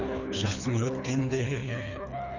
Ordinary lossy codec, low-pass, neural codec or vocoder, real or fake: none; 7.2 kHz; codec, 24 kHz, 3 kbps, HILCodec; fake